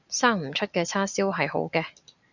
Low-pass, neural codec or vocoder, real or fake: 7.2 kHz; none; real